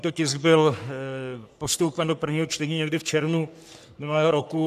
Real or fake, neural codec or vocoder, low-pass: fake; codec, 44.1 kHz, 3.4 kbps, Pupu-Codec; 14.4 kHz